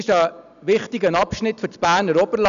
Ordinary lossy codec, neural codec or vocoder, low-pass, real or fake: MP3, 96 kbps; none; 7.2 kHz; real